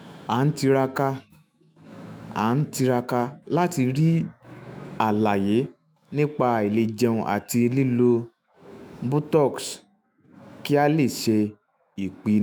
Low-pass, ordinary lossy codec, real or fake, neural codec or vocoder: none; none; fake; autoencoder, 48 kHz, 128 numbers a frame, DAC-VAE, trained on Japanese speech